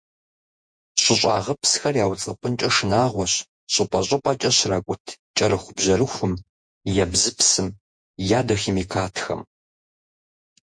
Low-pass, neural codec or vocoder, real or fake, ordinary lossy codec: 9.9 kHz; vocoder, 48 kHz, 128 mel bands, Vocos; fake; MP3, 64 kbps